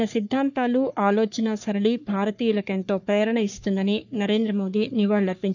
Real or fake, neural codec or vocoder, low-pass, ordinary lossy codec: fake; codec, 44.1 kHz, 3.4 kbps, Pupu-Codec; 7.2 kHz; none